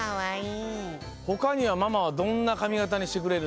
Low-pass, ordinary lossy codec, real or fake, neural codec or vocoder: none; none; real; none